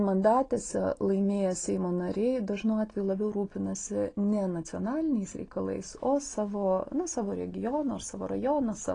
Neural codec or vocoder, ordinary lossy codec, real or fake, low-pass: none; AAC, 32 kbps; real; 9.9 kHz